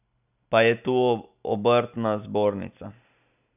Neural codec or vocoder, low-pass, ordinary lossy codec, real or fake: none; 3.6 kHz; none; real